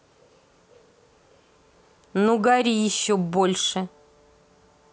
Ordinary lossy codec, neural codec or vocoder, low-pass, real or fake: none; none; none; real